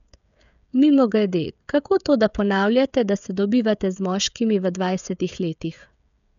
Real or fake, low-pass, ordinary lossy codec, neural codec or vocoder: fake; 7.2 kHz; none; codec, 16 kHz, 16 kbps, FreqCodec, smaller model